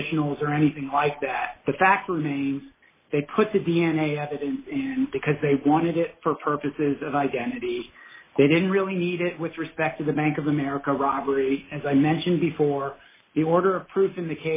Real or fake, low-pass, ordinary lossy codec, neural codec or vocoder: real; 3.6 kHz; MP3, 16 kbps; none